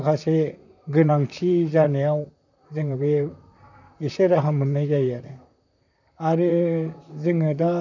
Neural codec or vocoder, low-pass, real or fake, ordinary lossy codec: vocoder, 44.1 kHz, 128 mel bands, Pupu-Vocoder; 7.2 kHz; fake; none